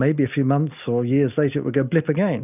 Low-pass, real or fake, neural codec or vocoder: 3.6 kHz; real; none